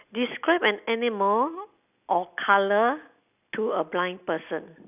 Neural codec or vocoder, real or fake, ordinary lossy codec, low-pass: none; real; none; 3.6 kHz